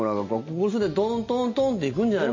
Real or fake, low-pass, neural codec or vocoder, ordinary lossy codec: real; 7.2 kHz; none; MP3, 32 kbps